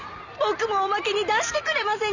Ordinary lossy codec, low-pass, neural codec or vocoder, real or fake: none; 7.2 kHz; none; real